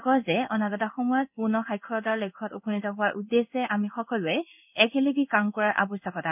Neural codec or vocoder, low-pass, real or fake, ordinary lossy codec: codec, 16 kHz in and 24 kHz out, 1 kbps, XY-Tokenizer; 3.6 kHz; fake; none